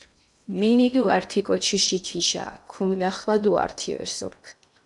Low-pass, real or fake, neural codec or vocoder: 10.8 kHz; fake; codec, 16 kHz in and 24 kHz out, 0.6 kbps, FocalCodec, streaming, 2048 codes